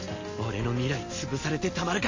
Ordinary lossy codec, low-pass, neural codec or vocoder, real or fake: MP3, 32 kbps; 7.2 kHz; none; real